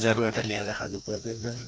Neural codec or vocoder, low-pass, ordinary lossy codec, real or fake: codec, 16 kHz, 1 kbps, FreqCodec, larger model; none; none; fake